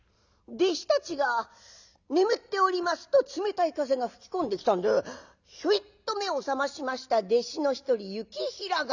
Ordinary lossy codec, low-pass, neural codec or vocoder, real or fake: none; 7.2 kHz; none; real